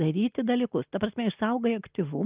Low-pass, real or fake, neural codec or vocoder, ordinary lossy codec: 3.6 kHz; real; none; Opus, 32 kbps